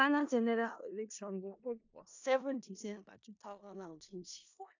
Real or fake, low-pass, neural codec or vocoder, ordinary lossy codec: fake; 7.2 kHz; codec, 16 kHz in and 24 kHz out, 0.4 kbps, LongCat-Audio-Codec, four codebook decoder; AAC, 48 kbps